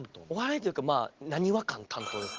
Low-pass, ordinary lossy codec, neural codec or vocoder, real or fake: 7.2 kHz; Opus, 16 kbps; none; real